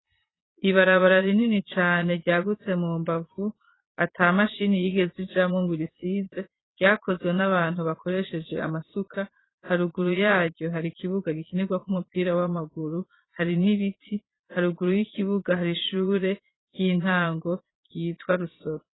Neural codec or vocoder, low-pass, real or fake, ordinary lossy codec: vocoder, 22.05 kHz, 80 mel bands, Vocos; 7.2 kHz; fake; AAC, 16 kbps